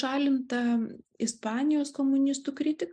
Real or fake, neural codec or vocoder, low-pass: real; none; 9.9 kHz